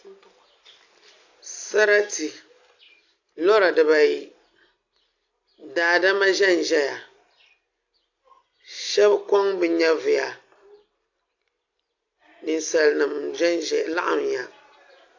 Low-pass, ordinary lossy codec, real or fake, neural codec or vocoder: 7.2 kHz; AAC, 48 kbps; real; none